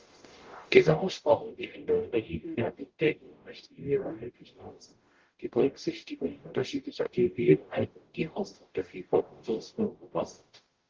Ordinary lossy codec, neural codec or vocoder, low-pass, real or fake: Opus, 16 kbps; codec, 44.1 kHz, 0.9 kbps, DAC; 7.2 kHz; fake